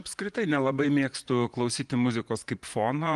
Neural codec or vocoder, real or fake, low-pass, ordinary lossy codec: vocoder, 24 kHz, 100 mel bands, Vocos; fake; 10.8 kHz; Opus, 24 kbps